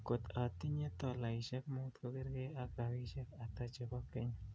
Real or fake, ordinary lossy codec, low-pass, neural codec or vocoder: real; none; none; none